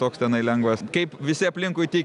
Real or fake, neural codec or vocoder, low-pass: real; none; 10.8 kHz